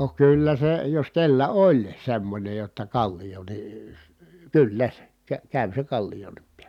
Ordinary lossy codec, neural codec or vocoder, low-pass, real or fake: none; none; 19.8 kHz; real